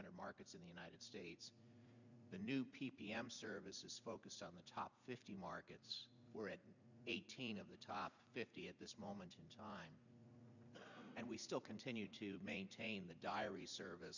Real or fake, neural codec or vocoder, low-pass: fake; vocoder, 44.1 kHz, 80 mel bands, Vocos; 7.2 kHz